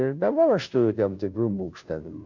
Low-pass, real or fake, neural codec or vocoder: 7.2 kHz; fake; codec, 16 kHz, 0.5 kbps, FunCodec, trained on Chinese and English, 25 frames a second